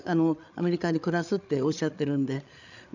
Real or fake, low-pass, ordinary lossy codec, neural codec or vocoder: fake; 7.2 kHz; none; codec, 16 kHz, 8 kbps, FreqCodec, larger model